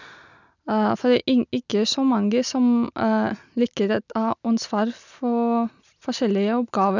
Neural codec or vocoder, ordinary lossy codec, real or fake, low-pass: none; none; real; 7.2 kHz